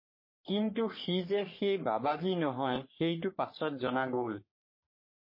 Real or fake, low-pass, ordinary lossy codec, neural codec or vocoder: fake; 5.4 kHz; MP3, 24 kbps; codec, 44.1 kHz, 3.4 kbps, Pupu-Codec